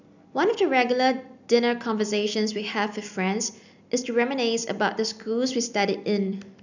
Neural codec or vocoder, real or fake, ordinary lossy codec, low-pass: none; real; none; 7.2 kHz